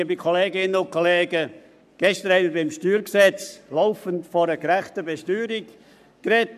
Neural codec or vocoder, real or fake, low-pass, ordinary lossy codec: codec, 44.1 kHz, 7.8 kbps, Pupu-Codec; fake; 14.4 kHz; none